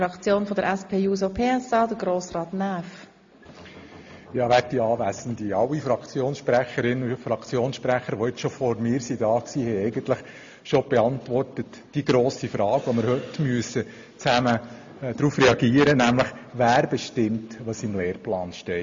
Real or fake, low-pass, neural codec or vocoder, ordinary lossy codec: real; 7.2 kHz; none; MP3, 48 kbps